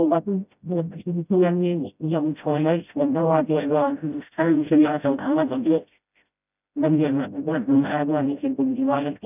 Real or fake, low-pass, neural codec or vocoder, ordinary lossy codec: fake; 3.6 kHz; codec, 16 kHz, 0.5 kbps, FreqCodec, smaller model; none